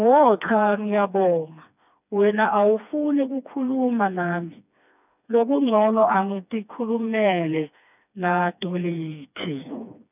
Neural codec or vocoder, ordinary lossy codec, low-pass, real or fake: codec, 16 kHz, 2 kbps, FreqCodec, smaller model; none; 3.6 kHz; fake